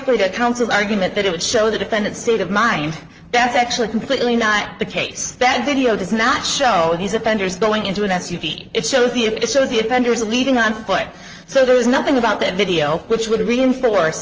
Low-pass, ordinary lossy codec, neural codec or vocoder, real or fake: 7.2 kHz; Opus, 24 kbps; vocoder, 44.1 kHz, 128 mel bands, Pupu-Vocoder; fake